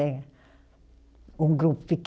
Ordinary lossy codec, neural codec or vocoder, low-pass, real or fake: none; none; none; real